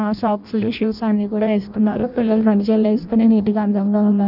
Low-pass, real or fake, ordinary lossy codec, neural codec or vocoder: 5.4 kHz; fake; none; codec, 16 kHz in and 24 kHz out, 0.6 kbps, FireRedTTS-2 codec